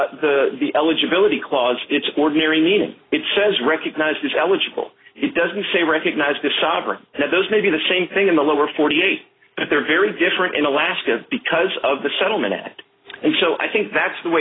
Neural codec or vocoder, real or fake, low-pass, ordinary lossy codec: none; real; 7.2 kHz; AAC, 16 kbps